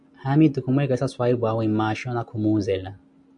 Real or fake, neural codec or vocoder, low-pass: real; none; 9.9 kHz